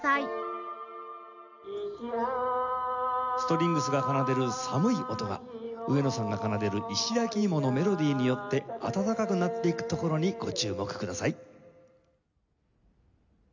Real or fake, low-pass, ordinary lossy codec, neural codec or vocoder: real; 7.2 kHz; none; none